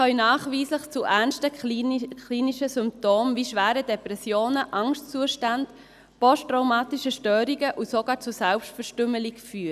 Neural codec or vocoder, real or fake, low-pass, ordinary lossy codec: none; real; 14.4 kHz; none